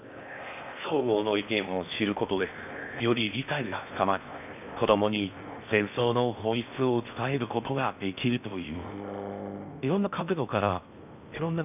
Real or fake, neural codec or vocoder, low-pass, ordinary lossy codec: fake; codec, 16 kHz in and 24 kHz out, 0.6 kbps, FocalCodec, streaming, 4096 codes; 3.6 kHz; none